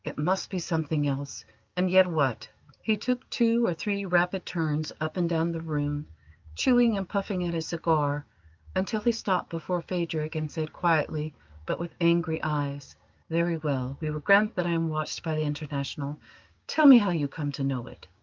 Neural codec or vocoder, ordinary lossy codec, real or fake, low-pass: codec, 16 kHz, 6 kbps, DAC; Opus, 24 kbps; fake; 7.2 kHz